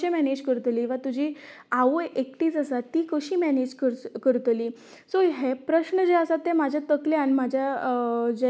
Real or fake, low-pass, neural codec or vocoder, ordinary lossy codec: real; none; none; none